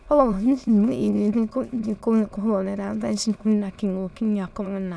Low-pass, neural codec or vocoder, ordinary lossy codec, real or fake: none; autoencoder, 22.05 kHz, a latent of 192 numbers a frame, VITS, trained on many speakers; none; fake